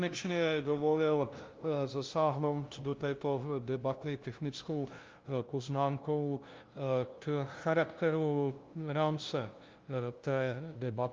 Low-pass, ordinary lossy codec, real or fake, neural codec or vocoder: 7.2 kHz; Opus, 24 kbps; fake; codec, 16 kHz, 0.5 kbps, FunCodec, trained on LibriTTS, 25 frames a second